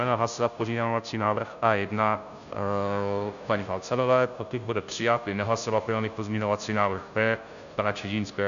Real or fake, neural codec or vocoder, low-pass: fake; codec, 16 kHz, 0.5 kbps, FunCodec, trained on Chinese and English, 25 frames a second; 7.2 kHz